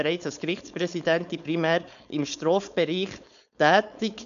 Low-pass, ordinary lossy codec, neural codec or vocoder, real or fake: 7.2 kHz; none; codec, 16 kHz, 4.8 kbps, FACodec; fake